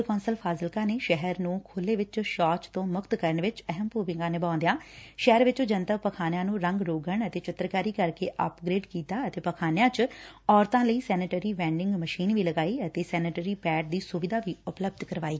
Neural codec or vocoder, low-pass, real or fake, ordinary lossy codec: none; none; real; none